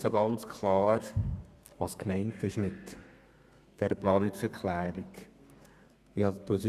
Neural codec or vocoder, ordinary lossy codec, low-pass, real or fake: codec, 32 kHz, 1.9 kbps, SNAC; Opus, 64 kbps; 14.4 kHz; fake